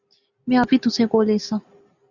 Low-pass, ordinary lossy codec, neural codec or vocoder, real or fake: 7.2 kHz; Opus, 64 kbps; none; real